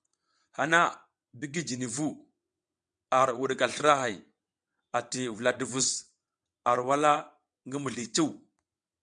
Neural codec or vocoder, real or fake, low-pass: vocoder, 22.05 kHz, 80 mel bands, WaveNeXt; fake; 9.9 kHz